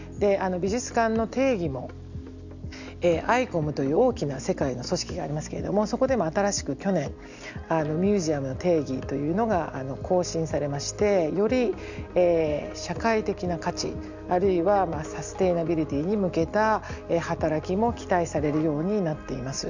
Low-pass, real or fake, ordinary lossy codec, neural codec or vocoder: 7.2 kHz; real; none; none